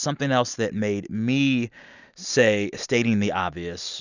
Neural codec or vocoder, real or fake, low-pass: none; real; 7.2 kHz